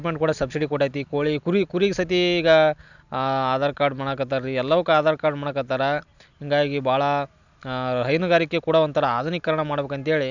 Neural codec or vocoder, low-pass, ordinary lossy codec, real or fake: none; 7.2 kHz; none; real